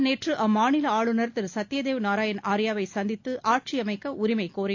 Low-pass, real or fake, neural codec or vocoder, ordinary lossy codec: 7.2 kHz; real; none; AAC, 48 kbps